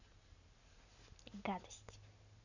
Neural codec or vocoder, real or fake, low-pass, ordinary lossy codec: none; real; 7.2 kHz; none